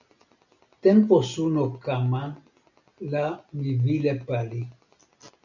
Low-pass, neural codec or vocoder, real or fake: 7.2 kHz; none; real